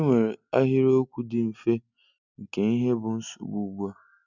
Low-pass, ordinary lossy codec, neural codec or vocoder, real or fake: 7.2 kHz; none; none; real